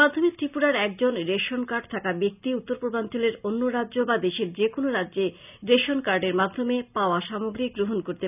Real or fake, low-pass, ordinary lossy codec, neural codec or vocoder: real; 3.6 kHz; none; none